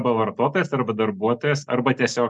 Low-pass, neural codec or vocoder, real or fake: 10.8 kHz; none; real